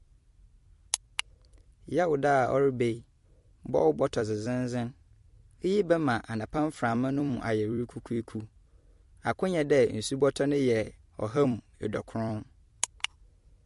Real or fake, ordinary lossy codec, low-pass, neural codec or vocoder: fake; MP3, 48 kbps; 14.4 kHz; vocoder, 44.1 kHz, 128 mel bands every 256 samples, BigVGAN v2